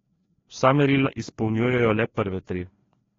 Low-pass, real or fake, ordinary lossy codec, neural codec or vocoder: 7.2 kHz; fake; AAC, 32 kbps; codec, 16 kHz, 2 kbps, FreqCodec, larger model